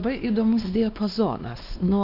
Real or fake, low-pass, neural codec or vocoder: fake; 5.4 kHz; codec, 16 kHz, 2 kbps, X-Codec, WavLM features, trained on Multilingual LibriSpeech